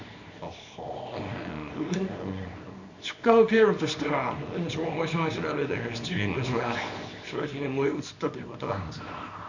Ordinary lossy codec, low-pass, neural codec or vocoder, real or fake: none; 7.2 kHz; codec, 24 kHz, 0.9 kbps, WavTokenizer, small release; fake